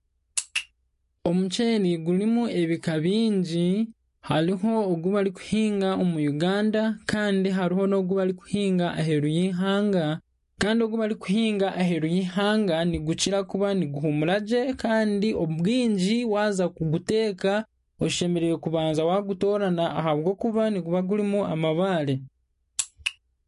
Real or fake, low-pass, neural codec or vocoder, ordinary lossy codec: real; 14.4 kHz; none; MP3, 48 kbps